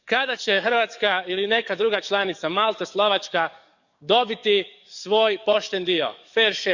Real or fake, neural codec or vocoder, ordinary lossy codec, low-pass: fake; codec, 44.1 kHz, 7.8 kbps, DAC; none; 7.2 kHz